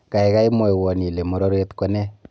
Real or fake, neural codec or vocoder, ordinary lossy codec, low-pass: real; none; none; none